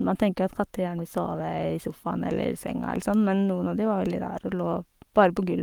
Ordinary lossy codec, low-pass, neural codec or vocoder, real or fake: Opus, 32 kbps; 19.8 kHz; codec, 44.1 kHz, 7.8 kbps, Pupu-Codec; fake